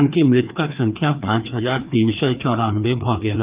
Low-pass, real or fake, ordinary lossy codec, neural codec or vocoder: 3.6 kHz; fake; Opus, 24 kbps; codec, 16 kHz, 2 kbps, FreqCodec, larger model